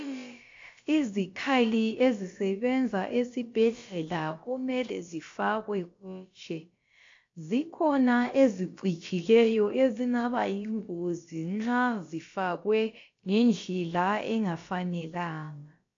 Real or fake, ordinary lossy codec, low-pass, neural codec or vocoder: fake; AAC, 48 kbps; 7.2 kHz; codec, 16 kHz, about 1 kbps, DyCAST, with the encoder's durations